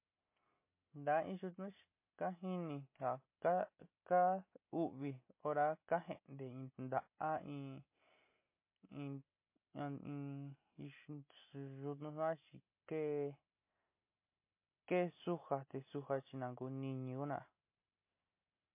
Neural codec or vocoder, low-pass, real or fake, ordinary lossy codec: none; 3.6 kHz; real; MP3, 24 kbps